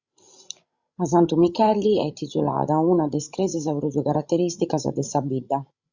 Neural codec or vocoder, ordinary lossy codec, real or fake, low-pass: codec, 16 kHz, 16 kbps, FreqCodec, larger model; Opus, 64 kbps; fake; 7.2 kHz